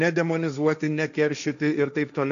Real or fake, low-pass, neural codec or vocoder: fake; 7.2 kHz; codec, 16 kHz, 1.1 kbps, Voila-Tokenizer